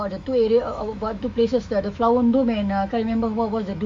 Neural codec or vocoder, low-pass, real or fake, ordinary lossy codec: autoencoder, 48 kHz, 128 numbers a frame, DAC-VAE, trained on Japanese speech; 9.9 kHz; fake; none